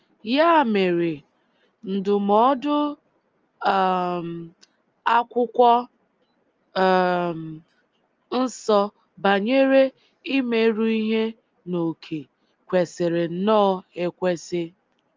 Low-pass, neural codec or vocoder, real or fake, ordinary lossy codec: 7.2 kHz; none; real; Opus, 24 kbps